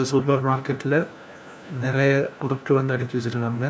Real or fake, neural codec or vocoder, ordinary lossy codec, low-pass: fake; codec, 16 kHz, 1 kbps, FunCodec, trained on LibriTTS, 50 frames a second; none; none